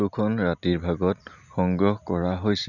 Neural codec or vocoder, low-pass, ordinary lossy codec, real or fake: none; 7.2 kHz; none; real